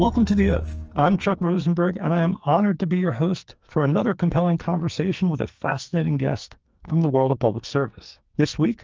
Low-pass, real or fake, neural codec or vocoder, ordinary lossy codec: 7.2 kHz; fake; codec, 44.1 kHz, 2.6 kbps, SNAC; Opus, 24 kbps